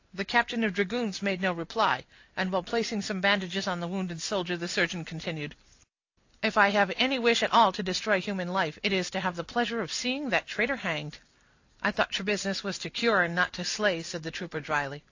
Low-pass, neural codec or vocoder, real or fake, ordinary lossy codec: 7.2 kHz; none; real; AAC, 48 kbps